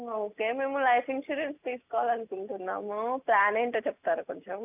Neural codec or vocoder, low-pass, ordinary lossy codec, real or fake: none; 3.6 kHz; none; real